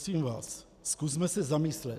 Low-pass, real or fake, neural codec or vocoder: 14.4 kHz; real; none